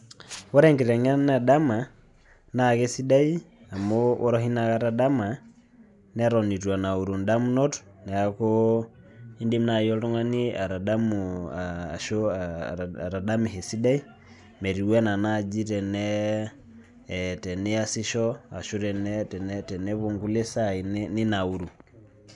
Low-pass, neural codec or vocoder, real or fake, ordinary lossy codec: 10.8 kHz; none; real; none